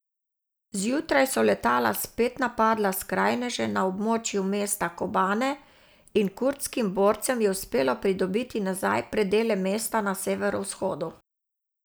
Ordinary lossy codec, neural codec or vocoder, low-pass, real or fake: none; none; none; real